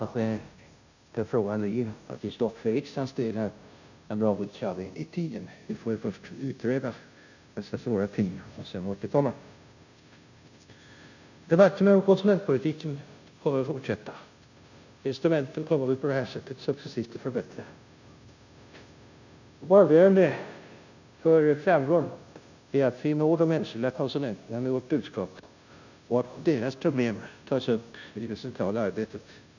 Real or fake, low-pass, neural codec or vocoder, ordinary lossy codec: fake; 7.2 kHz; codec, 16 kHz, 0.5 kbps, FunCodec, trained on Chinese and English, 25 frames a second; none